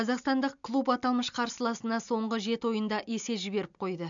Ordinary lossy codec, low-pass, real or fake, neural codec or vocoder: MP3, 64 kbps; 7.2 kHz; real; none